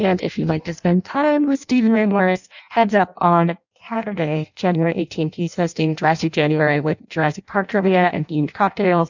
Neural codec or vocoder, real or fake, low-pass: codec, 16 kHz in and 24 kHz out, 0.6 kbps, FireRedTTS-2 codec; fake; 7.2 kHz